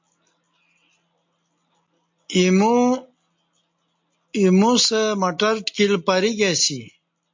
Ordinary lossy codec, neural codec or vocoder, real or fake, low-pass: MP3, 48 kbps; none; real; 7.2 kHz